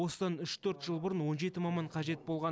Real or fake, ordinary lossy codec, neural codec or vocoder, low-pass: real; none; none; none